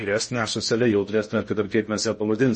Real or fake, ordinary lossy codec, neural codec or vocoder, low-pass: fake; MP3, 32 kbps; codec, 16 kHz in and 24 kHz out, 0.6 kbps, FocalCodec, streaming, 4096 codes; 10.8 kHz